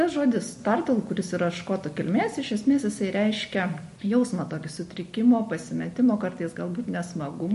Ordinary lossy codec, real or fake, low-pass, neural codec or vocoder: MP3, 48 kbps; real; 14.4 kHz; none